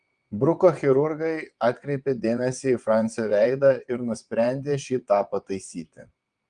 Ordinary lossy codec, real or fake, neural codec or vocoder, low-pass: Opus, 32 kbps; fake; vocoder, 22.05 kHz, 80 mel bands, WaveNeXt; 9.9 kHz